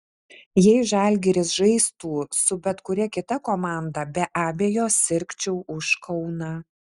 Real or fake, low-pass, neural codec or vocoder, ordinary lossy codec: real; 10.8 kHz; none; Opus, 64 kbps